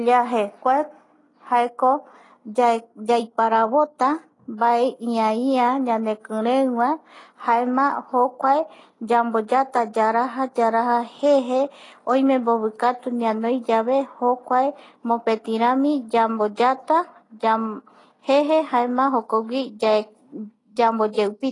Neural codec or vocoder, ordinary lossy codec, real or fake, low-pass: none; AAC, 32 kbps; real; 10.8 kHz